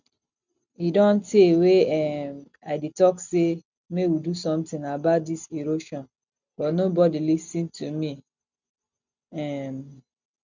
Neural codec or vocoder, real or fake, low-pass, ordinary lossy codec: none; real; 7.2 kHz; none